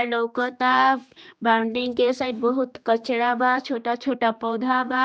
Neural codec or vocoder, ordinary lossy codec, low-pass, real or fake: codec, 16 kHz, 2 kbps, X-Codec, HuBERT features, trained on general audio; none; none; fake